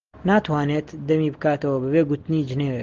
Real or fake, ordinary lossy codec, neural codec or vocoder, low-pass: real; Opus, 16 kbps; none; 7.2 kHz